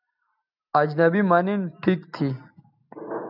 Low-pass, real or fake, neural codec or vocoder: 5.4 kHz; real; none